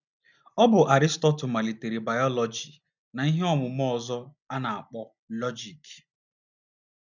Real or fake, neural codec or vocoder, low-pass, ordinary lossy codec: real; none; 7.2 kHz; none